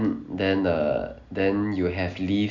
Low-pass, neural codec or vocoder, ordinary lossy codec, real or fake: 7.2 kHz; none; none; real